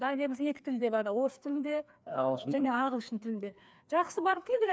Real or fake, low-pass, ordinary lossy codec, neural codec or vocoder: fake; none; none; codec, 16 kHz, 2 kbps, FreqCodec, larger model